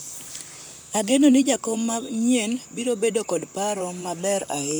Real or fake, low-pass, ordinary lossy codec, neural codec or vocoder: fake; none; none; vocoder, 44.1 kHz, 128 mel bands, Pupu-Vocoder